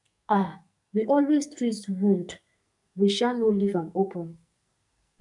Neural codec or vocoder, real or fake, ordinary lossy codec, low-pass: codec, 44.1 kHz, 2.6 kbps, SNAC; fake; none; 10.8 kHz